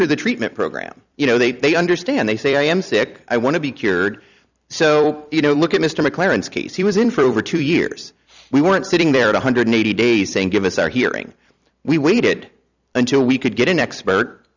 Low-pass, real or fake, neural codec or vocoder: 7.2 kHz; fake; vocoder, 44.1 kHz, 128 mel bands every 256 samples, BigVGAN v2